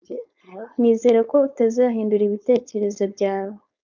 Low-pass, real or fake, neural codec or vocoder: 7.2 kHz; fake; codec, 16 kHz, 4.8 kbps, FACodec